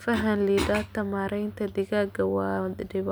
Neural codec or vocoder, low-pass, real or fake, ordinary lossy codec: none; none; real; none